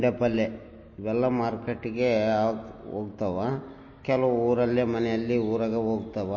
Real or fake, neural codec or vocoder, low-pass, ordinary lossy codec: real; none; 7.2 kHz; MP3, 32 kbps